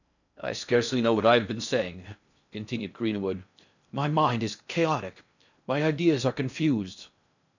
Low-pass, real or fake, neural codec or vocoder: 7.2 kHz; fake; codec, 16 kHz in and 24 kHz out, 0.6 kbps, FocalCodec, streaming, 4096 codes